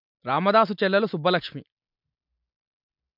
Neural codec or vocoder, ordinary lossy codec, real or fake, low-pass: none; MP3, 48 kbps; real; 5.4 kHz